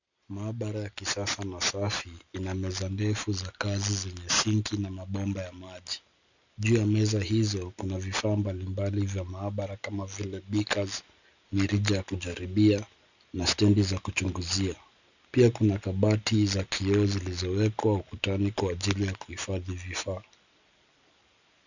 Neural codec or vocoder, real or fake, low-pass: none; real; 7.2 kHz